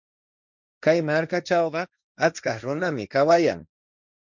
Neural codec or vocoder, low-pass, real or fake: codec, 16 kHz, 1.1 kbps, Voila-Tokenizer; 7.2 kHz; fake